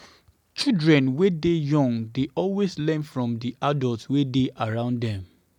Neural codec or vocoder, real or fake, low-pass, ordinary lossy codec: none; real; 19.8 kHz; none